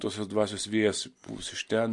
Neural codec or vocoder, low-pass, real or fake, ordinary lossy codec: none; 10.8 kHz; real; MP3, 48 kbps